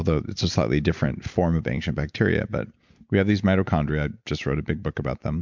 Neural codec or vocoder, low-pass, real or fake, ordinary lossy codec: none; 7.2 kHz; real; MP3, 64 kbps